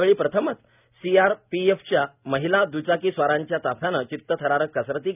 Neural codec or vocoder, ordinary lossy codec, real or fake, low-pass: none; none; real; 3.6 kHz